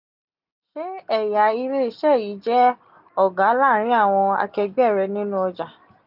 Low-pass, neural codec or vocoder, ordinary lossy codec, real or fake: 5.4 kHz; none; none; real